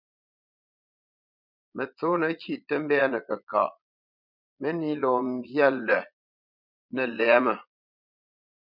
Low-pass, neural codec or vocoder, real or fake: 5.4 kHz; vocoder, 22.05 kHz, 80 mel bands, Vocos; fake